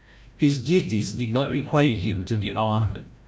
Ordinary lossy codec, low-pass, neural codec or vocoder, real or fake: none; none; codec, 16 kHz, 0.5 kbps, FreqCodec, larger model; fake